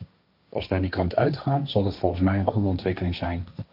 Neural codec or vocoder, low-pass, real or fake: codec, 16 kHz, 1.1 kbps, Voila-Tokenizer; 5.4 kHz; fake